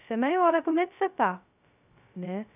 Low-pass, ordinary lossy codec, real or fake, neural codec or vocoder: 3.6 kHz; none; fake; codec, 16 kHz, 0.2 kbps, FocalCodec